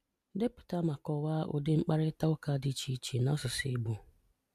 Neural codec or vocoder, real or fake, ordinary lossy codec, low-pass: none; real; MP3, 96 kbps; 14.4 kHz